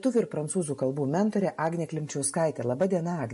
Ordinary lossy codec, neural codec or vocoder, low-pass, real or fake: MP3, 48 kbps; none; 14.4 kHz; real